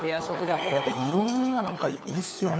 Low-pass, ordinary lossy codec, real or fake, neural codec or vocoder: none; none; fake; codec, 16 kHz, 4 kbps, FunCodec, trained on LibriTTS, 50 frames a second